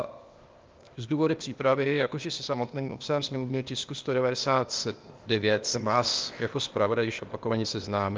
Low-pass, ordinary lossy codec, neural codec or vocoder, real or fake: 7.2 kHz; Opus, 24 kbps; codec, 16 kHz, 0.8 kbps, ZipCodec; fake